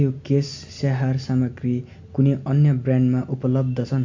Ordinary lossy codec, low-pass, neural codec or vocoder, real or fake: MP3, 64 kbps; 7.2 kHz; none; real